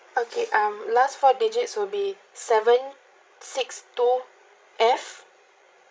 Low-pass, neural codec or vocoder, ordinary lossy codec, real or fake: none; codec, 16 kHz, 16 kbps, FreqCodec, larger model; none; fake